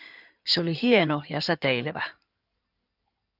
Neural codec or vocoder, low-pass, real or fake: codec, 16 kHz in and 24 kHz out, 2.2 kbps, FireRedTTS-2 codec; 5.4 kHz; fake